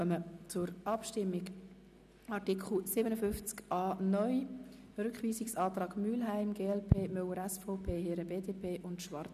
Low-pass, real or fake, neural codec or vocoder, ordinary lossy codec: 14.4 kHz; real; none; none